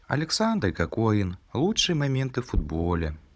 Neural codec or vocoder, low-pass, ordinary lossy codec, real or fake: codec, 16 kHz, 16 kbps, FunCodec, trained on Chinese and English, 50 frames a second; none; none; fake